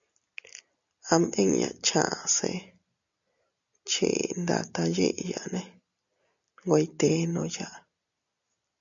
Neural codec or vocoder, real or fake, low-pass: none; real; 7.2 kHz